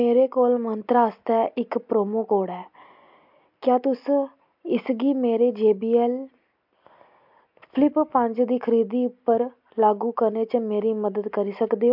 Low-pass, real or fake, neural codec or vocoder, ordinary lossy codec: 5.4 kHz; real; none; MP3, 48 kbps